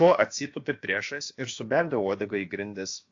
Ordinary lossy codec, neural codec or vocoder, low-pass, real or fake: AAC, 48 kbps; codec, 16 kHz, about 1 kbps, DyCAST, with the encoder's durations; 7.2 kHz; fake